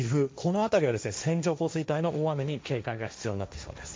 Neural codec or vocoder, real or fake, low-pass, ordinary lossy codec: codec, 16 kHz, 1.1 kbps, Voila-Tokenizer; fake; none; none